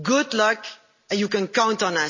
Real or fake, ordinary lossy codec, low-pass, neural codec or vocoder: real; MP3, 32 kbps; 7.2 kHz; none